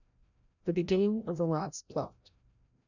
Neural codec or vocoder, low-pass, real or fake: codec, 16 kHz, 0.5 kbps, FreqCodec, larger model; 7.2 kHz; fake